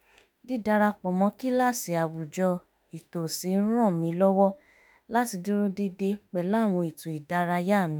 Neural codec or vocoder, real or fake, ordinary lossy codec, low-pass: autoencoder, 48 kHz, 32 numbers a frame, DAC-VAE, trained on Japanese speech; fake; none; none